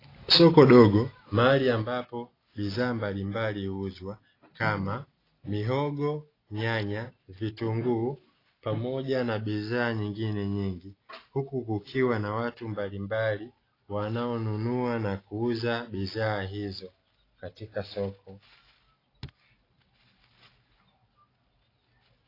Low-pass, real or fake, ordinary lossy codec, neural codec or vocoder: 5.4 kHz; real; AAC, 24 kbps; none